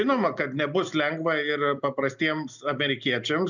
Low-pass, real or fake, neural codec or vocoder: 7.2 kHz; real; none